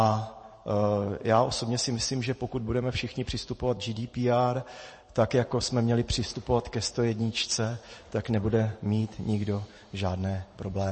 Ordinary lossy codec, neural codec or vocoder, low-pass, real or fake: MP3, 32 kbps; vocoder, 44.1 kHz, 128 mel bands every 512 samples, BigVGAN v2; 10.8 kHz; fake